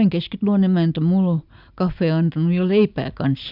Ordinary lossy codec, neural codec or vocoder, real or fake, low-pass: none; none; real; 5.4 kHz